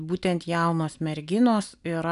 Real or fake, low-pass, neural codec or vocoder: real; 10.8 kHz; none